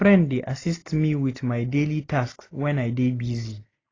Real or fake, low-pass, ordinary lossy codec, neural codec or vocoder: real; 7.2 kHz; AAC, 32 kbps; none